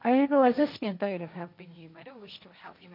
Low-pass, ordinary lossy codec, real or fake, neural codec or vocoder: 5.4 kHz; none; fake; codec, 16 kHz, 1.1 kbps, Voila-Tokenizer